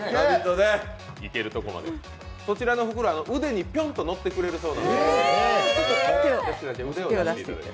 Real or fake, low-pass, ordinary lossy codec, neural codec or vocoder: real; none; none; none